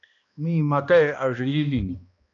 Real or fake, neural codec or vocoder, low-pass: fake; codec, 16 kHz, 1 kbps, X-Codec, HuBERT features, trained on balanced general audio; 7.2 kHz